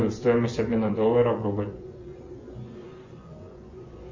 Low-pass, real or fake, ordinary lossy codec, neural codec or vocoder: 7.2 kHz; fake; MP3, 32 kbps; vocoder, 24 kHz, 100 mel bands, Vocos